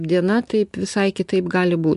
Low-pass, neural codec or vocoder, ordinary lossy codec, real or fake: 10.8 kHz; none; MP3, 64 kbps; real